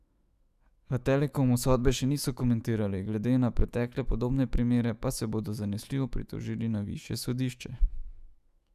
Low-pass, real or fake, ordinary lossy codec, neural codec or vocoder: 14.4 kHz; fake; Opus, 64 kbps; autoencoder, 48 kHz, 128 numbers a frame, DAC-VAE, trained on Japanese speech